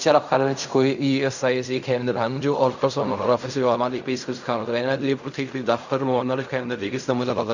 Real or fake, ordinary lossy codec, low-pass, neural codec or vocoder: fake; none; 7.2 kHz; codec, 16 kHz in and 24 kHz out, 0.4 kbps, LongCat-Audio-Codec, fine tuned four codebook decoder